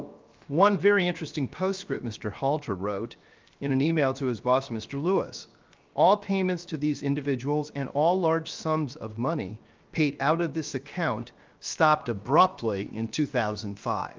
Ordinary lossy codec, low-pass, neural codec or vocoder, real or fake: Opus, 24 kbps; 7.2 kHz; codec, 16 kHz, 0.7 kbps, FocalCodec; fake